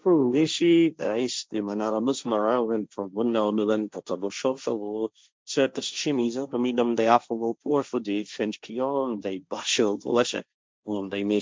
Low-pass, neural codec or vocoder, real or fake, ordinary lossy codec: none; codec, 16 kHz, 1.1 kbps, Voila-Tokenizer; fake; none